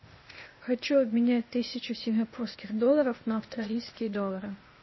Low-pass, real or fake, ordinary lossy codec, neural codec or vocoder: 7.2 kHz; fake; MP3, 24 kbps; codec, 16 kHz, 0.8 kbps, ZipCodec